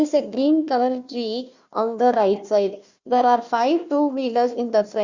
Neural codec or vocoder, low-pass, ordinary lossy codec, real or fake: codec, 16 kHz, 1 kbps, FunCodec, trained on Chinese and English, 50 frames a second; 7.2 kHz; Opus, 64 kbps; fake